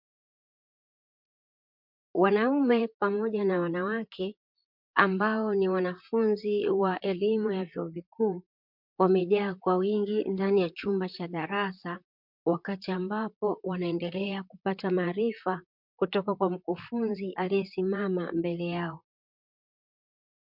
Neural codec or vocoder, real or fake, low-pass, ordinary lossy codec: vocoder, 44.1 kHz, 128 mel bands, Pupu-Vocoder; fake; 5.4 kHz; MP3, 48 kbps